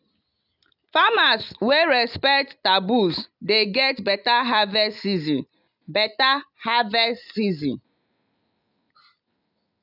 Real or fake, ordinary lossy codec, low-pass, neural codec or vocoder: real; none; 5.4 kHz; none